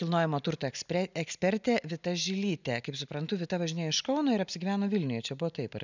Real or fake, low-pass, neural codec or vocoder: real; 7.2 kHz; none